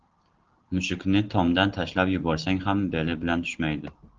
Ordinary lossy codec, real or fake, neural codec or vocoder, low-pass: Opus, 16 kbps; real; none; 7.2 kHz